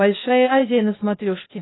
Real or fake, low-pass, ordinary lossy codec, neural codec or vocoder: fake; 7.2 kHz; AAC, 16 kbps; codec, 16 kHz, 0.8 kbps, ZipCodec